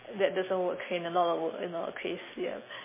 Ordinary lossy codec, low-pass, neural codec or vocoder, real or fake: MP3, 16 kbps; 3.6 kHz; none; real